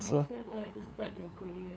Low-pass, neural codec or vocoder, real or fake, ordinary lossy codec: none; codec, 16 kHz, 2 kbps, FunCodec, trained on LibriTTS, 25 frames a second; fake; none